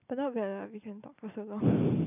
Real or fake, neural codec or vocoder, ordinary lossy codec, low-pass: real; none; none; 3.6 kHz